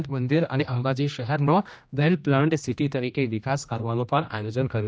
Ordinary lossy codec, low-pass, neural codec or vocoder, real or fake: none; none; codec, 16 kHz, 1 kbps, X-Codec, HuBERT features, trained on general audio; fake